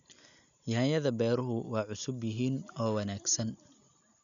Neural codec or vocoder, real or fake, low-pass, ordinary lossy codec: none; real; 7.2 kHz; none